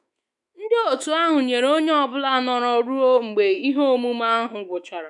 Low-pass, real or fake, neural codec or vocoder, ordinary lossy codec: 10.8 kHz; fake; autoencoder, 48 kHz, 128 numbers a frame, DAC-VAE, trained on Japanese speech; none